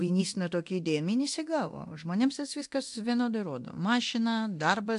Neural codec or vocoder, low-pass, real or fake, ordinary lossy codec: codec, 24 kHz, 0.9 kbps, DualCodec; 10.8 kHz; fake; AAC, 64 kbps